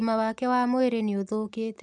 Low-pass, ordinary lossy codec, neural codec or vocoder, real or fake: 10.8 kHz; none; none; real